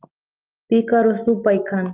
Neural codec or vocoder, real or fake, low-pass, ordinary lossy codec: none; real; 3.6 kHz; Opus, 32 kbps